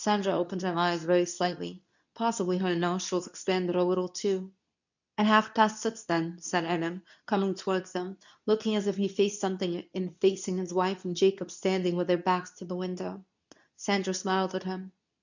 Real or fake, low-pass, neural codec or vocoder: fake; 7.2 kHz; codec, 24 kHz, 0.9 kbps, WavTokenizer, medium speech release version 2